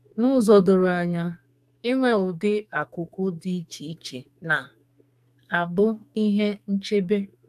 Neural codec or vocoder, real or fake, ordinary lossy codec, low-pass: codec, 44.1 kHz, 2.6 kbps, SNAC; fake; AAC, 96 kbps; 14.4 kHz